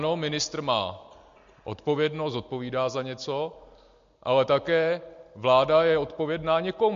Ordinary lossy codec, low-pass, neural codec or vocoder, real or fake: MP3, 48 kbps; 7.2 kHz; none; real